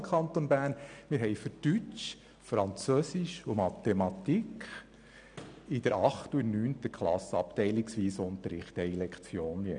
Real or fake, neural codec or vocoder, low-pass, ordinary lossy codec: real; none; 9.9 kHz; none